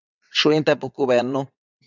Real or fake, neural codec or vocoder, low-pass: fake; vocoder, 22.05 kHz, 80 mel bands, WaveNeXt; 7.2 kHz